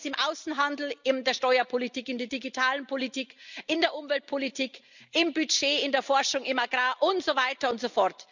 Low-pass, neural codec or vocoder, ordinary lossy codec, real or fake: 7.2 kHz; none; none; real